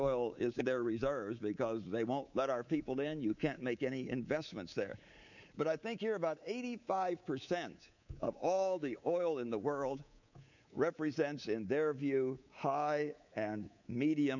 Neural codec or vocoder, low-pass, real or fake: codec, 24 kHz, 3.1 kbps, DualCodec; 7.2 kHz; fake